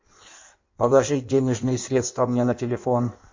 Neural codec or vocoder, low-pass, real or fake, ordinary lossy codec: codec, 16 kHz in and 24 kHz out, 1.1 kbps, FireRedTTS-2 codec; 7.2 kHz; fake; MP3, 48 kbps